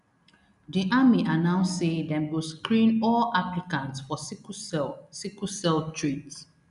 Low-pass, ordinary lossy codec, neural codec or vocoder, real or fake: 10.8 kHz; none; none; real